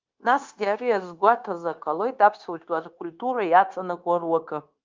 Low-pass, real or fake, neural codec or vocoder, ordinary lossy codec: 7.2 kHz; fake; codec, 16 kHz, 0.9 kbps, LongCat-Audio-Codec; Opus, 24 kbps